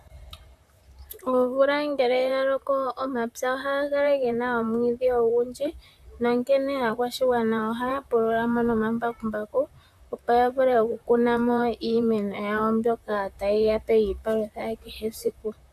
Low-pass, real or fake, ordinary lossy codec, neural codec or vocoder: 14.4 kHz; fake; AAC, 96 kbps; vocoder, 44.1 kHz, 128 mel bands, Pupu-Vocoder